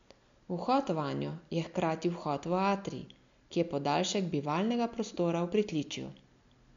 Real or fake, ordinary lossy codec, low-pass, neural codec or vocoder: real; MP3, 64 kbps; 7.2 kHz; none